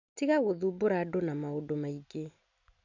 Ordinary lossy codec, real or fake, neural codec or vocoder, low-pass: none; real; none; 7.2 kHz